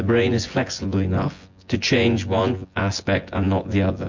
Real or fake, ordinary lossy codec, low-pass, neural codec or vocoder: fake; MP3, 48 kbps; 7.2 kHz; vocoder, 24 kHz, 100 mel bands, Vocos